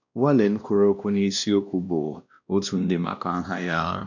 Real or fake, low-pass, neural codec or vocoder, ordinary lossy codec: fake; 7.2 kHz; codec, 16 kHz, 1 kbps, X-Codec, WavLM features, trained on Multilingual LibriSpeech; none